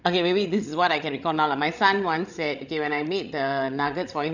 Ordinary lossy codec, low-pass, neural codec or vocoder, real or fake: none; 7.2 kHz; codec, 16 kHz, 16 kbps, FreqCodec, larger model; fake